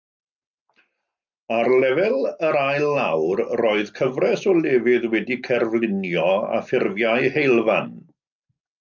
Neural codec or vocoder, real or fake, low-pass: none; real; 7.2 kHz